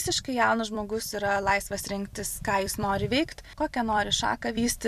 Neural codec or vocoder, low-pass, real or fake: vocoder, 44.1 kHz, 128 mel bands every 256 samples, BigVGAN v2; 14.4 kHz; fake